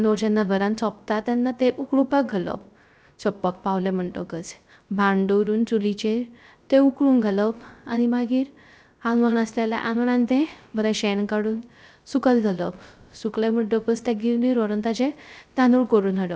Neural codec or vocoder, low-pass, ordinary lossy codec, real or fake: codec, 16 kHz, 0.3 kbps, FocalCodec; none; none; fake